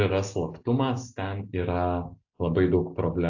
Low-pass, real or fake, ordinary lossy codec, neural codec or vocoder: 7.2 kHz; real; AAC, 48 kbps; none